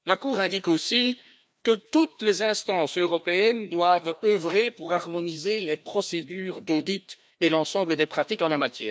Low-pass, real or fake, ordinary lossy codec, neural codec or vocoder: none; fake; none; codec, 16 kHz, 1 kbps, FreqCodec, larger model